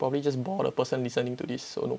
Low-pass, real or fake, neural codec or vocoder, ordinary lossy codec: none; real; none; none